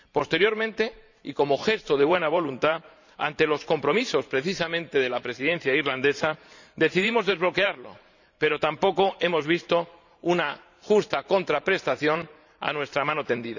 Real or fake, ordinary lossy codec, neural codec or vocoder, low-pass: fake; none; vocoder, 44.1 kHz, 128 mel bands every 512 samples, BigVGAN v2; 7.2 kHz